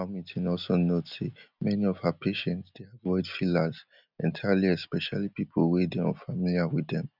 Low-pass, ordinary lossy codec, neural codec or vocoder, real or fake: 5.4 kHz; none; none; real